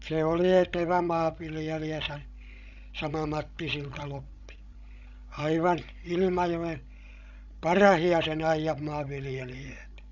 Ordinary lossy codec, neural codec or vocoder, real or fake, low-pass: none; codec, 16 kHz, 16 kbps, FreqCodec, larger model; fake; 7.2 kHz